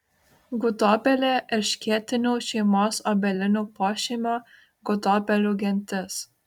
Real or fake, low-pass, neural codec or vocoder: fake; 19.8 kHz; vocoder, 44.1 kHz, 128 mel bands every 256 samples, BigVGAN v2